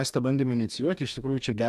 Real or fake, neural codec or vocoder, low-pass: fake; codec, 44.1 kHz, 2.6 kbps, DAC; 14.4 kHz